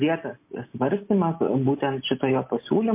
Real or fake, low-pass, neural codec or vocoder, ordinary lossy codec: real; 3.6 kHz; none; MP3, 24 kbps